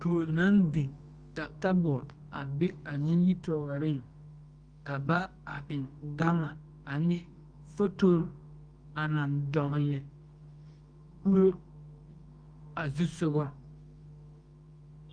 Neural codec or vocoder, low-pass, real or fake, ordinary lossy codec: codec, 24 kHz, 0.9 kbps, WavTokenizer, medium music audio release; 9.9 kHz; fake; Opus, 32 kbps